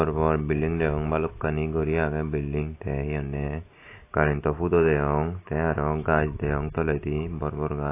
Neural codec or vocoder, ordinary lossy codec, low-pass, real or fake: none; AAC, 24 kbps; 3.6 kHz; real